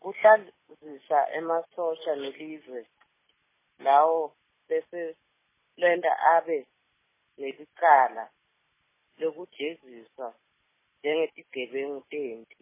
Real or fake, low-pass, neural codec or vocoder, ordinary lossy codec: real; 3.6 kHz; none; MP3, 16 kbps